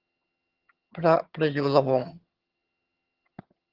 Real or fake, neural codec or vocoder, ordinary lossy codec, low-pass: fake; vocoder, 22.05 kHz, 80 mel bands, HiFi-GAN; Opus, 16 kbps; 5.4 kHz